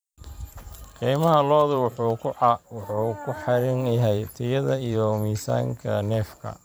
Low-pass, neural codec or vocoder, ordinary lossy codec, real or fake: none; vocoder, 44.1 kHz, 128 mel bands every 512 samples, BigVGAN v2; none; fake